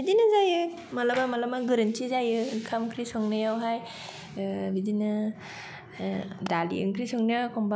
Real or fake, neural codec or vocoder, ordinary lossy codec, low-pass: real; none; none; none